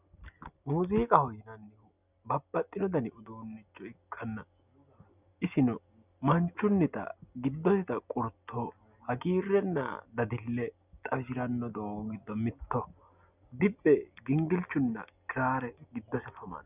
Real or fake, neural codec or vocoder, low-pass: real; none; 3.6 kHz